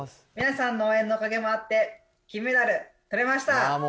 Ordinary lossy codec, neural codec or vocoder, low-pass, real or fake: none; none; none; real